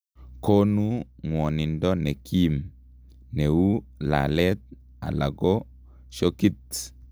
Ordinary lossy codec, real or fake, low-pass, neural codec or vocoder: none; real; none; none